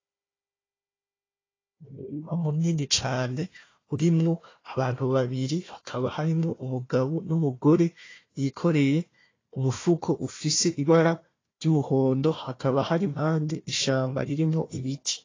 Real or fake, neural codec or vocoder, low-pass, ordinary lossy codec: fake; codec, 16 kHz, 1 kbps, FunCodec, trained on Chinese and English, 50 frames a second; 7.2 kHz; AAC, 32 kbps